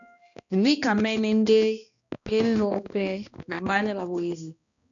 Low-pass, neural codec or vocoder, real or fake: 7.2 kHz; codec, 16 kHz, 1 kbps, X-Codec, HuBERT features, trained on balanced general audio; fake